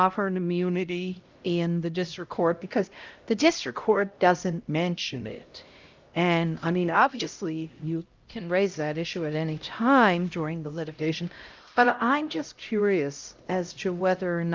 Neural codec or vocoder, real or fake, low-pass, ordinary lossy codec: codec, 16 kHz, 0.5 kbps, X-Codec, HuBERT features, trained on LibriSpeech; fake; 7.2 kHz; Opus, 24 kbps